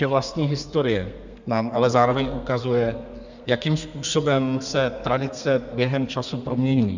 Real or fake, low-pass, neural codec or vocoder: fake; 7.2 kHz; codec, 32 kHz, 1.9 kbps, SNAC